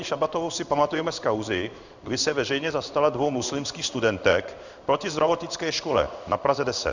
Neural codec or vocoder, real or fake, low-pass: codec, 16 kHz in and 24 kHz out, 1 kbps, XY-Tokenizer; fake; 7.2 kHz